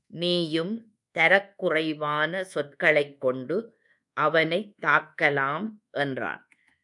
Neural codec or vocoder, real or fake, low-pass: codec, 24 kHz, 1.2 kbps, DualCodec; fake; 10.8 kHz